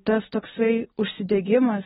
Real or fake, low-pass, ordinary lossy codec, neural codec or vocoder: real; 10.8 kHz; AAC, 16 kbps; none